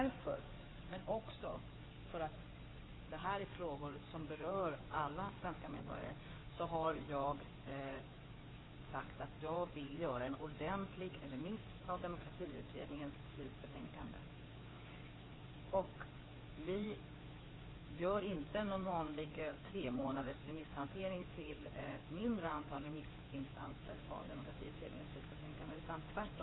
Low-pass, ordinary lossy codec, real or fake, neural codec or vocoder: 7.2 kHz; AAC, 16 kbps; fake; codec, 16 kHz in and 24 kHz out, 2.2 kbps, FireRedTTS-2 codec